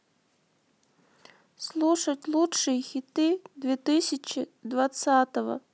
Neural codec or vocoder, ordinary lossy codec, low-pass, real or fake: none; none; none; real